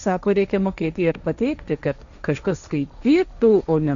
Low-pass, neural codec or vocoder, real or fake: 7.2 kHz; codec, 16 kHz, 1.1 kbps, Voila-Tokenizer; fake